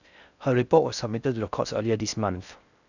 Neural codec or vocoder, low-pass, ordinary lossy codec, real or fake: codec, 16 kHz in and 24 kHz out, 0.6 kbps, FocalCodec, streaming, 4096 codes; 7.2 kHz; none; fake